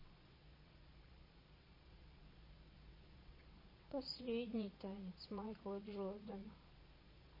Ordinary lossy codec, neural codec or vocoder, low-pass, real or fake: MP3, 24 kbps; vocoder, 22.05 kHz, 80 mel bands, Vocos; 5.4 kHz; fake